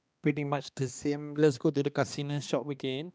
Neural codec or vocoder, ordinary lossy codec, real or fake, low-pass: codec, 16 kHz, 2 kbps, X-Codec, HuBERT features, trained on balanced general audio; none; fake; none